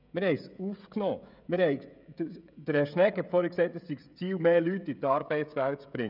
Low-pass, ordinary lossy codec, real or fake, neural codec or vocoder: 5.4 kHz; none; fake; codec, 16 kHz, 16 kbps, FreqCodec, smaller model